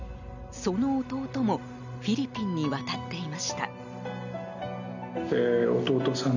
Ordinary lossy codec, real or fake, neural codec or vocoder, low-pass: none; real; none; 7.2 kHz